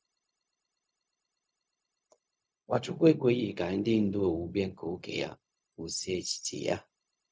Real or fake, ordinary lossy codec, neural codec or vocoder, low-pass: fake; none; codec, 16 kHz, 0.4 kbps, LongCat-Audio-Codec; none